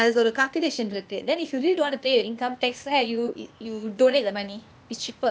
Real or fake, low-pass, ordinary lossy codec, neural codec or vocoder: fake; none; none; codec, 16 kHz, 0.8 kbps, ZipCodec